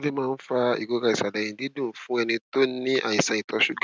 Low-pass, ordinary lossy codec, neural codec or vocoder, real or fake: none; none; none; real